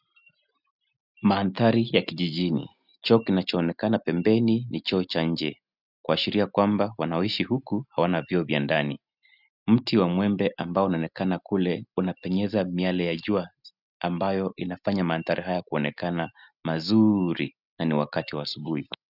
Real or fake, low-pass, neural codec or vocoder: real; 5.4 kHz; none